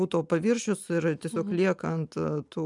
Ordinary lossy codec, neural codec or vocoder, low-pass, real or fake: MP3, 96 kbps; none; 10.8 kHz; real